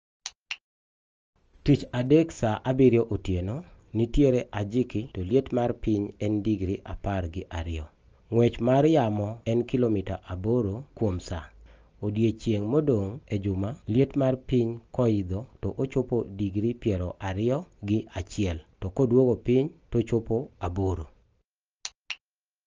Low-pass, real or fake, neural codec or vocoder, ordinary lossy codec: 7.2 kHz; real; none; Opus, 24 kbps